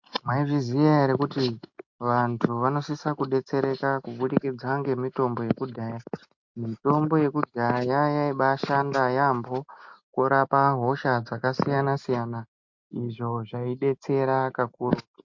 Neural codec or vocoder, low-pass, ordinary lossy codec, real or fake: none; 7.2 kHz; MP3, 48 kbps; real